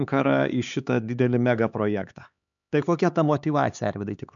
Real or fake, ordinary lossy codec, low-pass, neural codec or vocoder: fake; MP3, 96 kbps; 7.2 kHz; codec, 16 kHz, 4 kbps, X-Codec, HuBERT features, trained on LibriSpeech